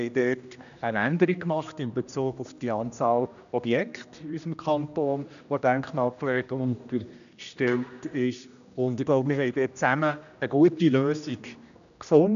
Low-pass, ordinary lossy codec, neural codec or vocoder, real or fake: 7.2 kHz; none; codec, 16 kHz, 1 kbps, X-Codec, HuBERT features, trained on general audio; fake